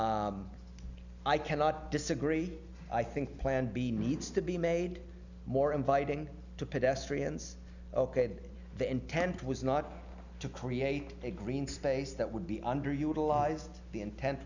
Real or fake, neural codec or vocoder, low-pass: real; none; 7.2 kHz